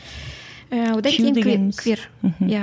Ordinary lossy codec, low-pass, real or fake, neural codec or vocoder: none; none; real; none